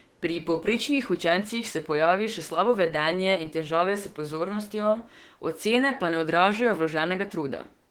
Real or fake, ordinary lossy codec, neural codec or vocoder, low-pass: fake; Opus, 16 kbps; autoencoder, 48 kHz, 32 numbers a frame, DAC-VAE, trained on Japanese speech; 19.8 kHz